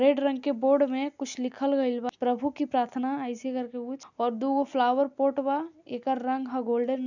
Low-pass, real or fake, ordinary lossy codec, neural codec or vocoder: 7.2 kHz; real; none; none